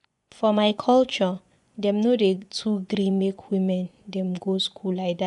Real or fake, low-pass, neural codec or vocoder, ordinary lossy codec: fake; 10.8 kHz; vocoder, 24 kHz, 100 mel bands, Vocos; none